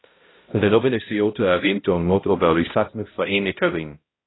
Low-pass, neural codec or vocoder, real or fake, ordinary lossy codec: 7.2 kHz; codec, 16 kHz, 0.5 kbps, X-Codec, HuBERT features, trained on balanced general audio; fake; AAC, 16 kbps